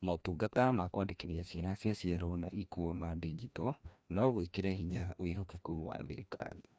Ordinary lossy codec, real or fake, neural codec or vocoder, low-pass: none; fake; codec, 16 kHz, 1 kbps, FreqCodec, larger model; none